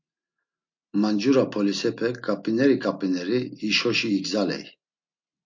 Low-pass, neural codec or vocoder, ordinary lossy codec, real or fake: 7.2 kHz; none; MP3, 48 kbps; real